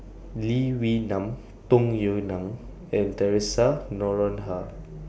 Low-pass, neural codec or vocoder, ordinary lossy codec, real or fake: none; none; none; real